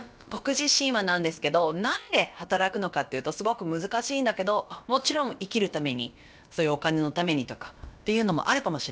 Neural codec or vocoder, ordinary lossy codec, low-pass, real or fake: codec, 16 kHz, about 1 kbps, DyCAST, with the encoder's durations; none; none; fake